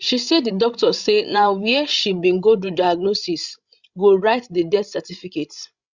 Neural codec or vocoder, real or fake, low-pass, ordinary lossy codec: codec, 16 kHz, 16 kbps, FreqCodec, larger model; fake; 7.2 kHz; Opus, 64 kbps